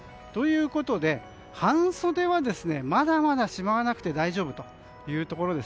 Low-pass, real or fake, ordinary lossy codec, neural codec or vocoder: none; real; none; none